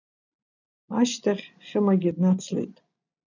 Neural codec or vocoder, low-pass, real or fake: none; 7.2 kHz; real